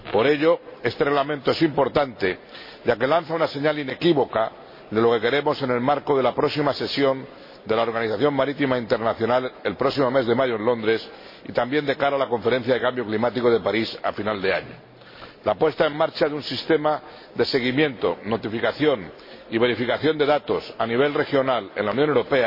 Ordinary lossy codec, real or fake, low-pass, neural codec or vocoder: MP3, 24 kbps; real; 5.4 kHz; none